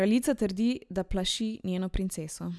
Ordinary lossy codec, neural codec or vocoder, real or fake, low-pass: none; none; real; none